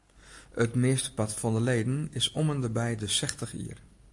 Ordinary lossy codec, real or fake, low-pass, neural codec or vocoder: AAC, 64 kbps; real; 10.8 kHz; none